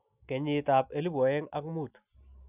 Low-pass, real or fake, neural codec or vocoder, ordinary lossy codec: 3.6 kHz; real; none; none